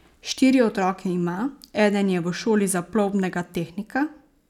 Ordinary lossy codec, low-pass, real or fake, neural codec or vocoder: none; 19.8 kHz; real; none